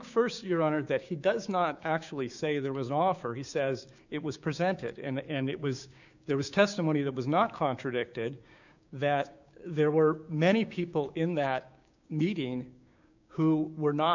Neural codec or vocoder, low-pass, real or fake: codec, 16 kHz, 6 kbps, DAC; 7.2 kHz; fake